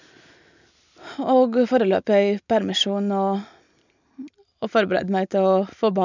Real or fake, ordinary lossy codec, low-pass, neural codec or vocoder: real; none; 7.2 kHz; none